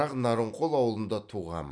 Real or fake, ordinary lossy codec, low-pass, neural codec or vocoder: real; none; 9.9 kHz; none